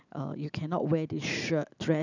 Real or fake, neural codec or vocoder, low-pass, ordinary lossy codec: real; none; 7.2 kHz; none